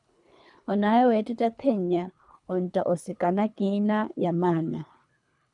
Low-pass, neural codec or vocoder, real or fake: 10.8 kHz; codec, 24 kHz, 3 kbps, HILCodec; fake